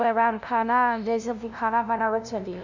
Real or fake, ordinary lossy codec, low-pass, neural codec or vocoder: fake; none; 7.2 kHz; codec, 16 kHz, 0.5 kbps, FunCodec, trained on LibriTTS, 25 frames a second